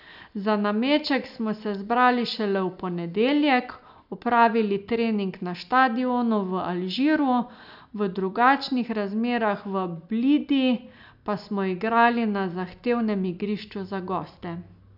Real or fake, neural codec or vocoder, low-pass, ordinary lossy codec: real; none; 5.4 kHz; none